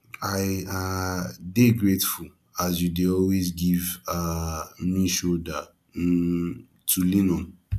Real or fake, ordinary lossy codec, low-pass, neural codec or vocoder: real; none; 14.4 kHz; none